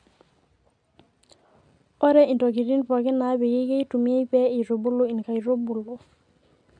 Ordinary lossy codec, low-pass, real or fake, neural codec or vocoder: none; 9.9 kHz; real; none